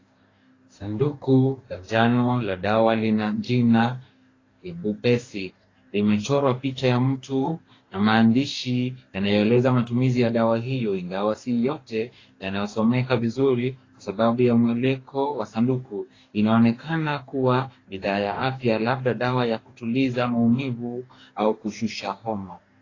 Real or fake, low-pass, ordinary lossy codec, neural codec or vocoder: fake; 7.2 kHz; AAC, 32 kbps; codec, 44.1 kHz, 2.6 kbps, DAC